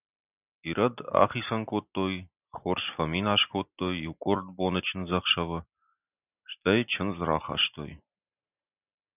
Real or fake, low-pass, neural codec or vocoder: real; 3.6 kHz; none